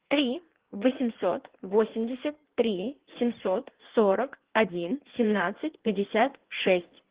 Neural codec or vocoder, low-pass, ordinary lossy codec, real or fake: codec, 16 kHz in and 24 kHz out, 1.1 kbps, FireRedTTS-2 codec; 3.6 kHz; Opus, 16 kbps; fake